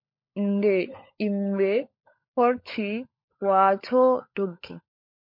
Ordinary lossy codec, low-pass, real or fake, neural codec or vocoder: MP3, 32 kbps; 5.4 kHz; fake; codec, 16 kHz, 16 kbps, FunCodec, trained on LibriTTS, 50 frames a second